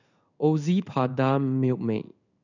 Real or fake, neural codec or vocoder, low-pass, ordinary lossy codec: fake; codec, 16 kHz in and 24 kHz out, 1 kbps, XY-Tokenizer; 7.2 kHz; none